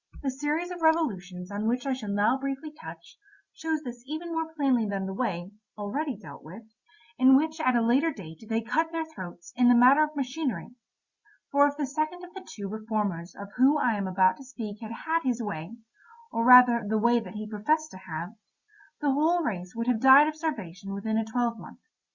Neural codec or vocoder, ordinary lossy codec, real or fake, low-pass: none; Opus, 64 kbps; real; 7.2 kHz